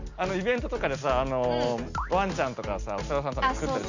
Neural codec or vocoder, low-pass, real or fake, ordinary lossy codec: none; 7.2 kHz; real; none